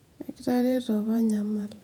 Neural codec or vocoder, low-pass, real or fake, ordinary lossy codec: vocoder, 48 kHz, 128 mel bands, Vocos; 19.8 kHz; fake; none